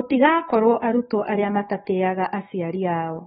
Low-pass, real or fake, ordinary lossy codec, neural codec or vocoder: 19.8 kHz; fake; AAC, 16 kbps; codec, 44.1 kHz, 7.8 kbps, DAC